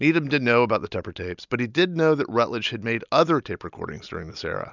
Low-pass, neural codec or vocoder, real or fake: 7.2 kHz; none; real